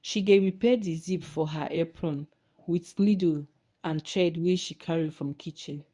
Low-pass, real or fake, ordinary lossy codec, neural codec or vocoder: 10.8 kHz; fake; none; codec, 24 kHz, 0.9 kbps, WavTokenizer, medium speech release version 1